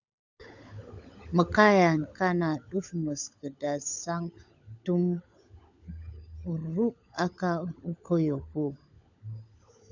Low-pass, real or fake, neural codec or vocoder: 7.2 kHz; fake; codec, 16 kHz, 16 kbps, FunCodec, trained on LibriTTS, 50 frames a second